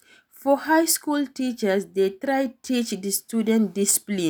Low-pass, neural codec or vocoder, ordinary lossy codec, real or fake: none; none; none; real